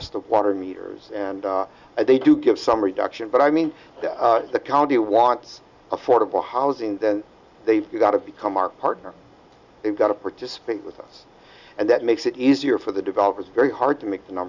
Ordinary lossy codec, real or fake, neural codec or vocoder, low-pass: Opus, 64 kbps; real; none; 7.2 kHz